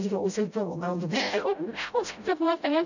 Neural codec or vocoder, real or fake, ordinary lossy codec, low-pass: codec, 16 kHz, 0.5 kbps, FreqCodec, smaller model; fake; none; 7.2 kHz